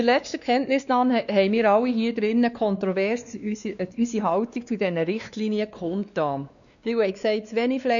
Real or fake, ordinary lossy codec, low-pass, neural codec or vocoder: fake; none; 7.2 kHz; codec, 16 kHz, 2 kbps, X-Codec, WavLM features, trained on Multilingual LibriSpeech